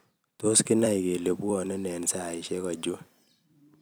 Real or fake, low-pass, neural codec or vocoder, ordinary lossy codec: fake; none; vocoder, 44.1 kHz, 128 mel bands every 256 samples, BigVGAN v2; none